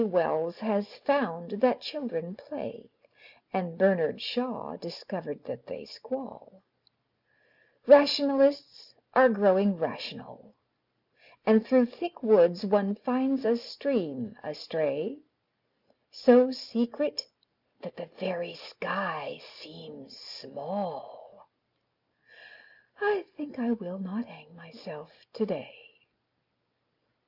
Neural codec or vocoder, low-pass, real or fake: none; 5.4 kHz; real